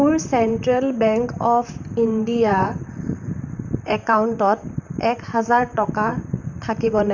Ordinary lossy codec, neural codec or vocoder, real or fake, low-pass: none; vocoder, 44.1 kHz, 128 mel bands, Pupu-Vocoder; fake; 7.2 kHz